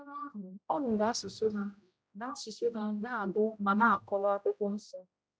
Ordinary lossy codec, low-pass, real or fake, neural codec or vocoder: none; none; fake; codec, 16 kHz, 0.5 kbps, X-Codec, HuBERT features, trained on general audio